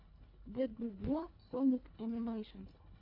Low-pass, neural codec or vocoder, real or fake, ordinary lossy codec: 5.4 kHz; codec, 24 kHz, 1.5 kbps, HILCodec; fake; MP3, 48 kbps